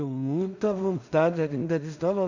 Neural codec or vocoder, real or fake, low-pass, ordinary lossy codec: codec, 16 kHz in and 24 kHz out, 0.4 kbps, LongCat-Audio-Codec, two codebook decoder; fake; 7.2 kHz; none